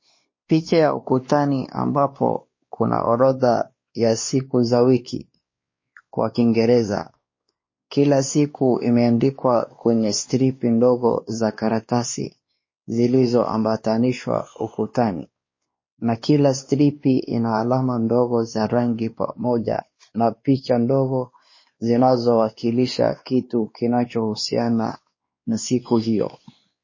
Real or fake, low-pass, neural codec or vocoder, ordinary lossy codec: fake; 7.2 kHz; codec, 16 kHz, 2 kbps, X-Codec, WavLM features, trained on Multilingual LibriSpeech; MP3, 32 kbps